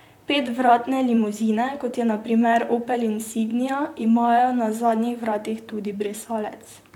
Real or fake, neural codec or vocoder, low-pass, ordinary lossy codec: fake; vocoder, 44.1 kHz, 128 mel bands, Pupu-Vocoder; 19.8 kHz; none